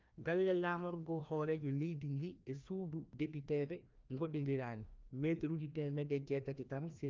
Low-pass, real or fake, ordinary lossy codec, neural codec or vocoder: 7.2 kHz; fake; Opus, 24 kbps; codec, 16 kHz, 1 kbps, FreqCodec, larger model